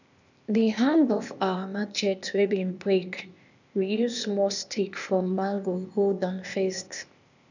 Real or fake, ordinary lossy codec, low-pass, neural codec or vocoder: fake; none; 7.2 kHz; codec, 16 kHz, 0.8 kbps, ZipCodec